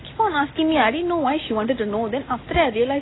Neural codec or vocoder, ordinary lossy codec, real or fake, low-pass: none; AAC, 16 kbps; real; 7.2 kHz